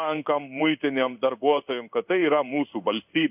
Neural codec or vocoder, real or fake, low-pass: codec, 16 kHz in and 24 kHz out, 1 kbps, XY-Tokenizer; fake; 3.6 kHz